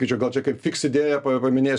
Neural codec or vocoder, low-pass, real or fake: none; 10.8 kHz; real